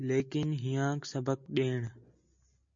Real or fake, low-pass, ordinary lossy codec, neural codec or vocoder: real; 7.2 kHz; MP3, 48 kbps; none